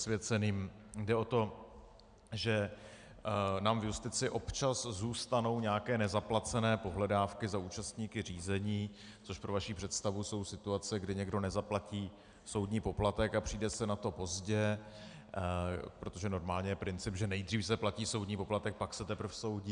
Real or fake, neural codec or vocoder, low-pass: real; none; 9.9 kHz